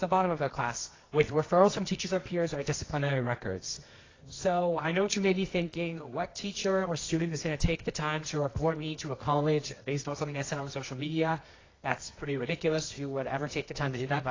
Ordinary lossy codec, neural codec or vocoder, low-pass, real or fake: AAC, 32 kbps; codec, 24 kHz, 0.9 kbps, WavTokenizer, medium music audio release; 7.2 kHz; fake